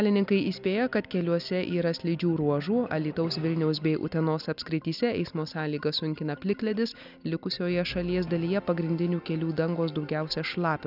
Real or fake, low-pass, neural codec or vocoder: real; 5.4 kHz; none